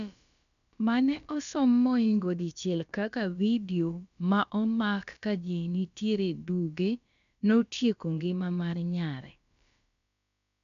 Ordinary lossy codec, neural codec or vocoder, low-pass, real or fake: none; codec, 16 kHz, about 1 kbps, DyCAST, with the encoder's durations; 7.2 kHz; fake